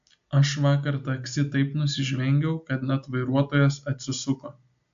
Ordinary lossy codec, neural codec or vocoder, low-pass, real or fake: AAC, 64 kbps; none; 7.2 kHz; real